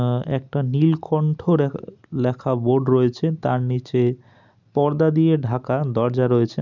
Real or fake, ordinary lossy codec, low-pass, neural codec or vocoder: real; none; 7.2 kHz; none